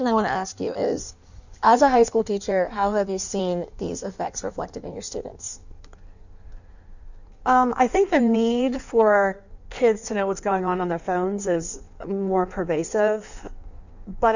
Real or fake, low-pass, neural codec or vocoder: fake; 7.2 kHz; codec, 16 kHz in and 24 kHz out, 1.1 kbps, FireRedTTS-2 codec